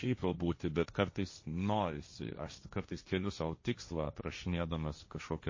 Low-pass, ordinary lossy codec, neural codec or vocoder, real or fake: 7.2 kHz; MP3, 32 kbps; codec, 16 kHz, 1.1 kbps, Voila-Tokenizer; fake